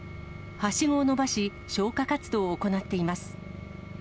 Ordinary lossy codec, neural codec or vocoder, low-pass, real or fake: none; none; none; real